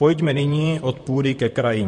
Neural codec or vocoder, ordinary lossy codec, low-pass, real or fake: vocoder, 44.1 kHz, 128 mel bands, Pupu-Vocoder; MP3, 48 kbps; 14.4 kHz; fake